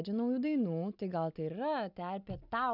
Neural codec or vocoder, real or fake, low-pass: none; real; 5.4 kHz